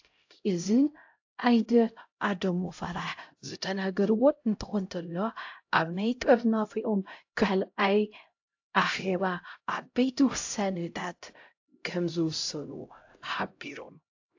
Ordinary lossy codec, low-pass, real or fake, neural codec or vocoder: AAC, 48 kbps; 7.2 kHz; fake; codec, 16 kHz, 0.5 kbps, X-Codec, HuBERT features, trained on LibriSpeech